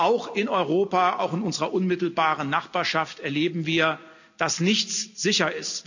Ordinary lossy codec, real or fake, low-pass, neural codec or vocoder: none; real; 7.2 kHz; none